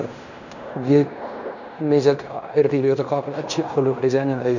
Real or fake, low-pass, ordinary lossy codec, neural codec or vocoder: fake; 7.2 kHz; none; codec, 16 kHz in and 24 kHz out, 0.9 kbps, LongCat-Audio-Codec, fine tuned four codebook decoder